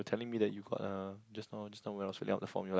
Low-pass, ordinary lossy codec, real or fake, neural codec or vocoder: none; none; real; none